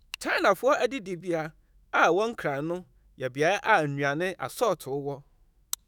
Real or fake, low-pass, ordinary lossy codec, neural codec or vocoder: fake; none; none; autoencoder, 48 kHz, 128 numbers a frame, DAC-VAE, trained on Japanese speech